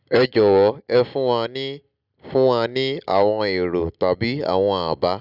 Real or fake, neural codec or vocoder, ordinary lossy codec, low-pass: real; none; none; 5.4 kHz